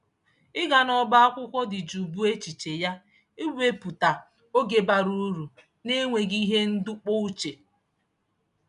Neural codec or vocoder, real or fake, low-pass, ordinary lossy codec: none; real; 10.8 kHz; none